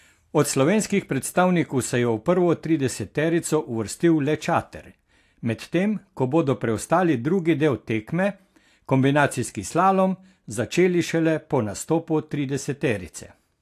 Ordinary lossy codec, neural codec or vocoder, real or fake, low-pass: AAC, 64 kbps; none; real; 14.4 kHz